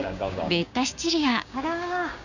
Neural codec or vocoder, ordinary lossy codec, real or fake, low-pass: codec, 16 kHz, 6 kbps, DAC; none; fake; 7.2 kHz